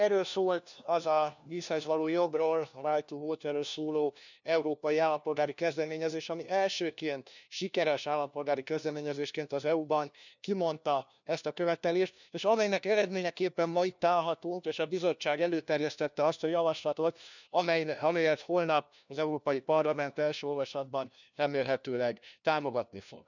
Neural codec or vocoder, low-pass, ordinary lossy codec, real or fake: codec, 16 kHz, 1 kbps, FunCodec, trained on LibriTTS, 50 frames a second; 7.2 kHz; none; fake